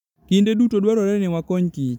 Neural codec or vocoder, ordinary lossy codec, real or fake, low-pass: vocoder, 44.1 kHz, 128 mel bands every 512 samples, BigVGAN v2; none; fake; 19.8 kHz